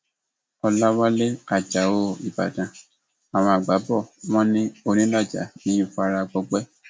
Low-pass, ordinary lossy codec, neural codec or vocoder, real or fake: none; none; none; real